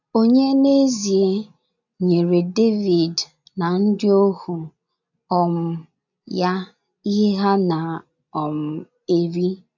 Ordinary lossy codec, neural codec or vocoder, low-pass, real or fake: none; none; 7.2 kHz; real